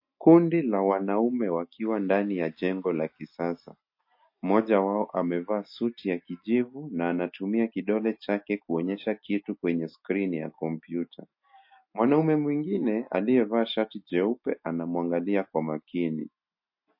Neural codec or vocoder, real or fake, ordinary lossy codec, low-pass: none; real; MP3, 32 kbps; 5.4 kHz